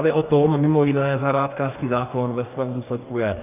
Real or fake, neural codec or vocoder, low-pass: fake; codec, 44.1 kHz, 2.6 kbps, DAC; 3.6 kHz